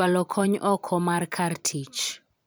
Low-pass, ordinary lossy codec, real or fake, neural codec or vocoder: none; none; fake; vocoder, 44.1 kHz, 128 mel bands every 256 samples, BigVGAN v2